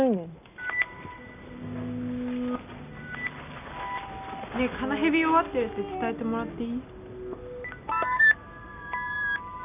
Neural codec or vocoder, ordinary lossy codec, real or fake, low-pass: none; none; real; 3.6 kHz